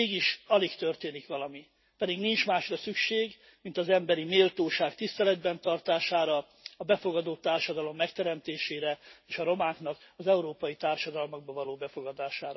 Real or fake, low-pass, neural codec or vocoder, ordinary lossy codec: real; 7.2 kHz; none; MP3, 24 kbps